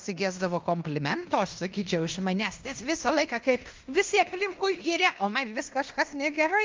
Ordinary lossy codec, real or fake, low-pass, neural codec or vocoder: Opus, 32 kbps; fake; 7.2 kHz; codec, 16 kHz in and 24 kHz out, 0.9 kbps, LongCat-Audio-Codec, fine tuned four codebook decoder